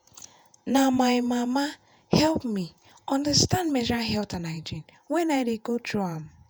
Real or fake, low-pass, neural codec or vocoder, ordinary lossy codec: fake; none; vocoder, 48 kHz, 128 mel bands, Vocos; none